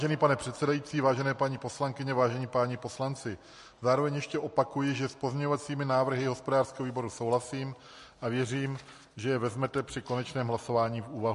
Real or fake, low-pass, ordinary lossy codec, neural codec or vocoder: real; 14.4 kHz; MP3, 48 kbps; none